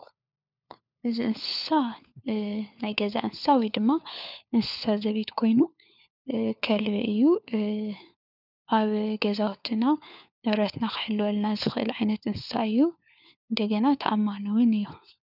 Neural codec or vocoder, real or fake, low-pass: codec, 16 kHz, 4 kbps, FunCodec, trained on LibriTTS, 50 frames a second; fake; 5.4 kHz